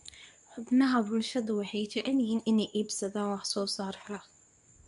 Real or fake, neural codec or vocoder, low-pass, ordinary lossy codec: fake; codec, 24 kHz, 0.9 kbps, WavTokenizer, medium speech release version 2; 10.8 kHz; none